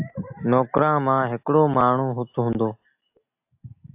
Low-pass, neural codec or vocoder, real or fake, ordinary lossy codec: 3.6 kHz; none; real; AAC, 32 kbps